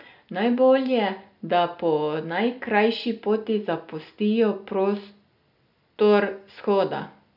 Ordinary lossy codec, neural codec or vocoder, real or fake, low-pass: none; none; real; 5.4 kHz